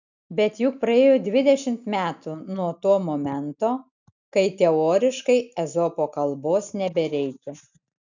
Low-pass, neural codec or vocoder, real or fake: 7.2 kHz; none; real